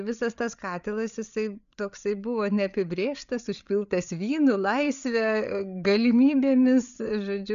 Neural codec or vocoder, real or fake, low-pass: codec, 16 kHz, 8 kbps, FreqCodec, larger model; fake; 7.2 kHz